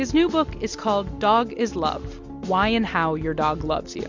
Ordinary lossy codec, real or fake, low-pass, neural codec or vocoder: MP3, 64 kbps; real; 7.2 kHz; none